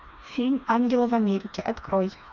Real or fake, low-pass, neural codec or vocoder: fake; 7.2 kHz; codec, 16 kHz, 2 kbps, FreqCodec, smaller model